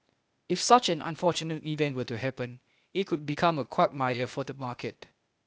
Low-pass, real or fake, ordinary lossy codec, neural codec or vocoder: none; fake; none; codec, 16 kHz, 0.8 kbps, ZipCodec